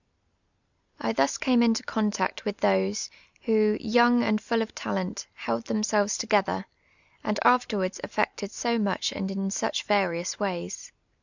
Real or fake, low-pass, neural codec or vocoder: real; 7.2 kHz; none